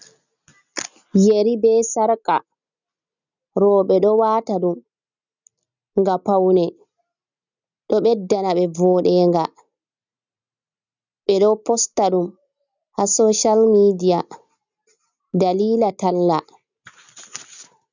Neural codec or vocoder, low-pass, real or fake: none; 7.2 kHz; real